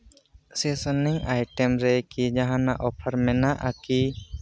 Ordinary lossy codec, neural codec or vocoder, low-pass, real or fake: none; none; none; real